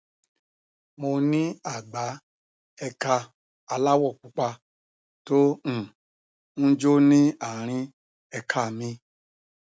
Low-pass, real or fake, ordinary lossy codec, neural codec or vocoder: none; real; none; none